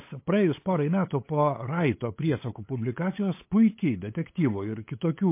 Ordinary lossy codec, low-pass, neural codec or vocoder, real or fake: MP3, 24 kbps; 3.6 kHz; codec, 16 kHz, 8 kbps, FunCodec, trained on LibriTTS, 25 frames a second; fake